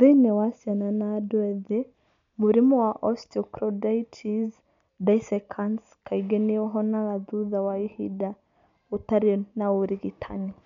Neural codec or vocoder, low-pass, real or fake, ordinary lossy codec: none; 7.2 kHz; real; MP3, 64 kbps